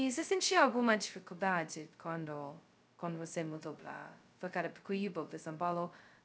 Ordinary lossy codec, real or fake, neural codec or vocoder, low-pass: none; fake; codec, 16 kHz, 0.2 kbps, FocalCodec; none